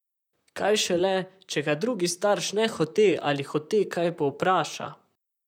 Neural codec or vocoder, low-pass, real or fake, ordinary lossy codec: vocoder, 44.1 kHz, 128 mel bands, Pupu-Vocoder; 19.8 kHz; fake; none